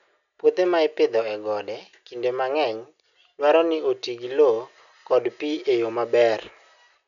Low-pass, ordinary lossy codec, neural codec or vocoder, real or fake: 7.2 kHz; none; none; real